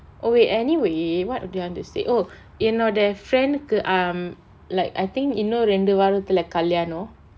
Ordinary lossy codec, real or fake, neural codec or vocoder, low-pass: none; real; none; none